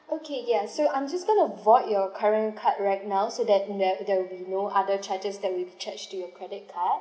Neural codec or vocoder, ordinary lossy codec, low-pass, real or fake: none; none; none; real